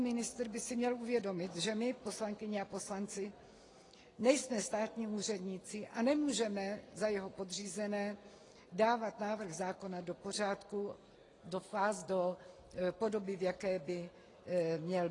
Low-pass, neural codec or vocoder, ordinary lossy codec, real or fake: 10.8 kHz; none; AAC, 32 kbps; real